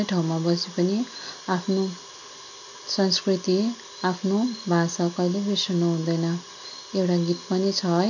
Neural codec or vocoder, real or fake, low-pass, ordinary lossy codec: none; real; 7.2 kHz; none